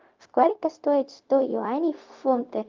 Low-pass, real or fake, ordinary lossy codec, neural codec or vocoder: 7.2 kHz; fake; Opus, 32 kbps; codec, 16 kHz, 0.4 kbps, LongCat-Audio-Codec